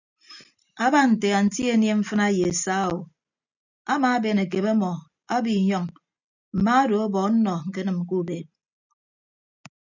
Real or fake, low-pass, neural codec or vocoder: real; 7.2 kHz; none